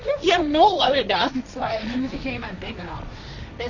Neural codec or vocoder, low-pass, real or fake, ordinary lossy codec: codec, 16 kHz, 1.1 kbps, Voila-Tokenizer; 7.2 kHz; fake; none